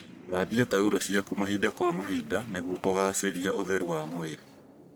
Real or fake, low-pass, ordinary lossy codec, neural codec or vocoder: fake; none; none; codec, 44.1 kHz, 1.7 kbps, Pupu-Codec